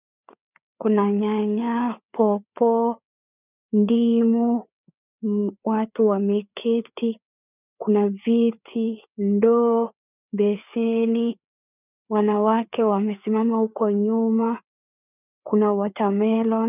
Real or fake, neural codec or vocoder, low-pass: fake; codec, 16 kHz, 4 kbps, FreqCodec, larger model; 3.6 kHz